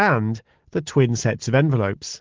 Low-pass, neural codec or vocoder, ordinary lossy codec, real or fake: 7.2 kHz; none; Opus, 16 kbps; real